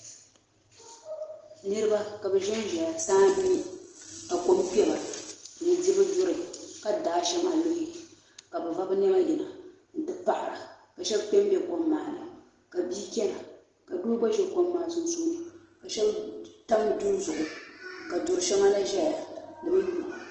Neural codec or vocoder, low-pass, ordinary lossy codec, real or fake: none; 7.2 kHz; Opus, 16 kbps; real